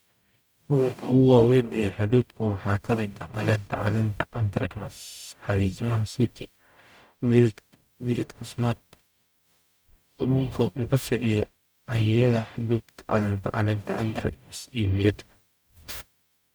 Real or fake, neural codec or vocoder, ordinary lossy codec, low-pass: fake; codec, 44.1 kHz, 0.9 kbps, DAC; none; none